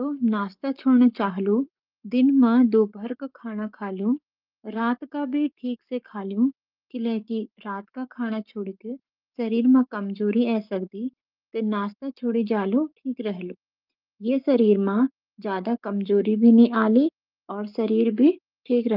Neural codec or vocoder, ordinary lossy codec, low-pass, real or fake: codec, 44.1 kHz, 7.8 kbps, Pupu-Codec; Opus, 24 kbps; 5.4 kHz; fake